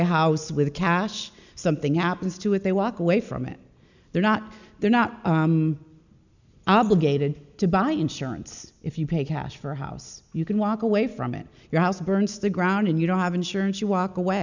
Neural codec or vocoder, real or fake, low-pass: none; real; 7.2 kHz